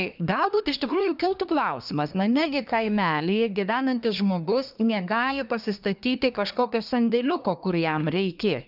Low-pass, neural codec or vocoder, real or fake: 5.4 kHz; codec, 24 kHz, 1 kbps, SNAC; fake